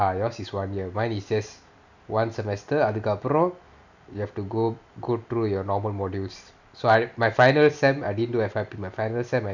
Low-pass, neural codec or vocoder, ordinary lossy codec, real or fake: 7.2 kHz; none; none; real